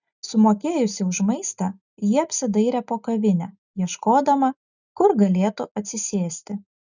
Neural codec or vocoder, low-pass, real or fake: none; 7.2 kHz; real